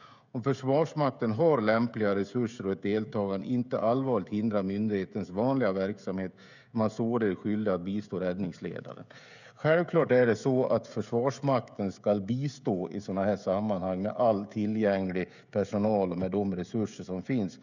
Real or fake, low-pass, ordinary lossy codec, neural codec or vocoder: fake; 7.2 kHz; none; codec, 16 kHz, 16 kbps, FreqCodec, smaller model